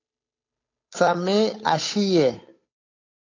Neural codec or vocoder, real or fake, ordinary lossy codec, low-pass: codec, 16 kHz, 8 kbps, FunCodec, trained on Chinese and English, 25 frames a second; fake; AAC, 32 kbps; 7.2 kHz